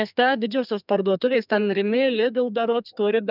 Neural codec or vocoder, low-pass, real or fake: codec, 32 kHz, 1.9 kbps, SNAC; 5.4 kHz; fake